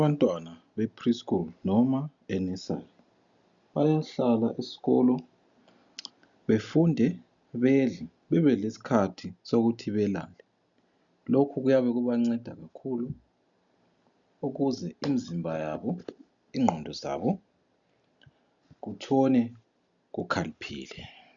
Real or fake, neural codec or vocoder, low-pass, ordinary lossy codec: real; none; 7.2 kHz; MP3, 96 kbps